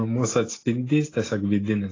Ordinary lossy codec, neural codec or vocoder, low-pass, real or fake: AAC, 32 kbps; none; 7.2 kHz; real